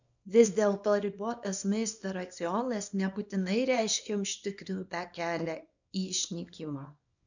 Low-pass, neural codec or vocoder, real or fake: 7.2 kHz; codec, 24 kHz, 0.9 kbps, WavTokenizer, small release; fake